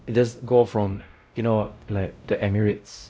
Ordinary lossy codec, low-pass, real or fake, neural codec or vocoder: none; none; fake; codec, 16 kHz, 0.5 kbps, X-Codec, WavLM features, trained on Multilingual LibriSpeech